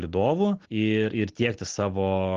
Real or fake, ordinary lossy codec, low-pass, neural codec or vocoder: real; Opus, 16 kbps; 7.2 kHz; none